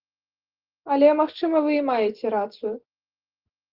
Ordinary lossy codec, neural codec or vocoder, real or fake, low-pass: Opus, 16 kbps; none; real; 5.4 kHz